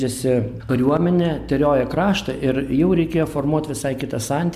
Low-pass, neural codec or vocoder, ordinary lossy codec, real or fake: 14.4 kHz; none; MP3, 96 kbps; real